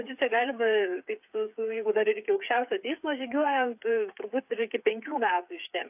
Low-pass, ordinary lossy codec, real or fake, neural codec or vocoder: 3.6 kHz; AAC, 32 kbps; fake; codec, 16 kHz, 8 kbps, FreqCodec, smaller model